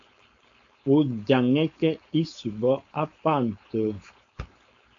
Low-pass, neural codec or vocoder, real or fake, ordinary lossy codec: 7.2 kHz; codec, 16 kHz, 4.8 kbps, FACodec; fake; AAC, 64 kbps